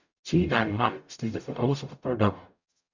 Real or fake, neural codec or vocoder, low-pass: fake; codec, 44.1 kHz, 0.9 kbps, DAC; 7.2 kHz